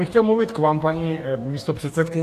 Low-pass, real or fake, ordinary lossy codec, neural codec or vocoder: 14.4 kHz; fake; AAC, 64 kbps; codec, 44.1 kHz, 2.6 kbps, DAC